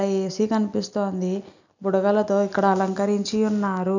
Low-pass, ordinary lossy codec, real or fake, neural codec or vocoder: 7.2 kHz; none; real; none